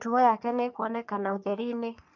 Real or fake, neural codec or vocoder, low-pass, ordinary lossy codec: fake; codec, 44.1 kHz, 3.4 kbps, Pupu-Codec; 7.2 kHz; none